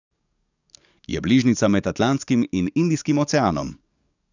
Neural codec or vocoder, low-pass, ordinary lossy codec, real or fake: codec, 44.1 kHz, 7.8 kbps, DAC; 7.2 kHz; none; fake